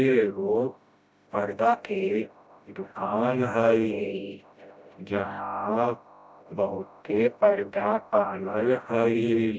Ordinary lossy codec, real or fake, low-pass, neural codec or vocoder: none; fake; none; codec, 16 kHz, 0.5 kbps, FreqCodec, smaller model